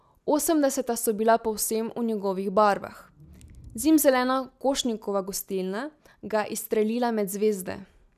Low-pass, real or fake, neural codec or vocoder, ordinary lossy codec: 14.4 kHz; real; none; none